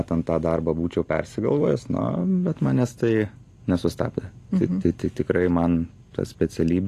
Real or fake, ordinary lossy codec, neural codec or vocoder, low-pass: real; AAC, 48 kbps; none; 14.4 kHz